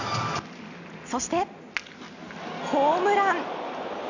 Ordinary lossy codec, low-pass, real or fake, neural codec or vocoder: none; 7.2 kHz; real; none